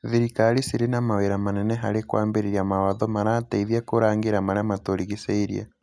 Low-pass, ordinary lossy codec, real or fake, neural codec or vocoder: none; none; real; none